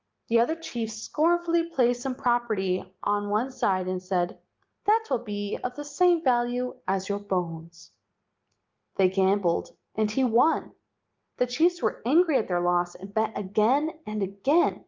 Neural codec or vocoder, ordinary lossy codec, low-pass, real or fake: none; Opus, 32 kbps; 7.2 kHz; real